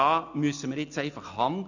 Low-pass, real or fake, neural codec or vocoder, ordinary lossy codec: 7.2 kHz; real; none; none